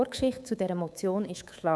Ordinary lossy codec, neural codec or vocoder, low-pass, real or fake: none; codec, 24 kHz, 3.1 kbps, DualCodec; none; fake